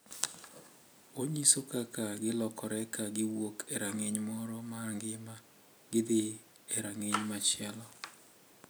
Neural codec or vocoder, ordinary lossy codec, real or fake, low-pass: none; none; real; none